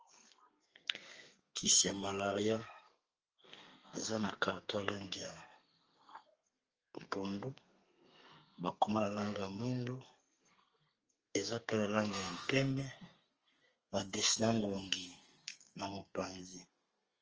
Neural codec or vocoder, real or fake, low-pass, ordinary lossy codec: codec, 32 kHz, 1.9 kbps, SNAC; fake; 7.2 kHz; Opus, 24 kbps